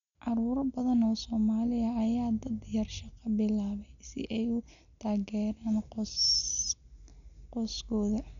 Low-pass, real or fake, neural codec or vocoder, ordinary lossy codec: 7.2 kHz; real; none; none